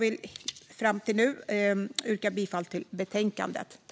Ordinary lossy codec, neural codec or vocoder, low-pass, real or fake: none; none; none; real